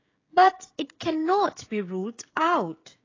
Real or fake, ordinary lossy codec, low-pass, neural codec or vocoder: fake; AAC, 32 kbps; 7.2 kHz; codec, 16 kHz, 16 kbps, FreqCodec, smaller model